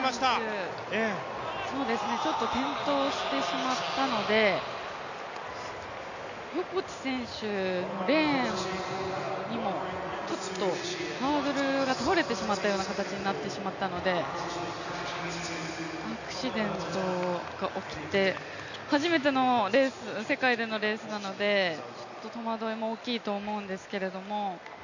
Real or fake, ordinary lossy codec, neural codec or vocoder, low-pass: real; none; none; 7.2 kHz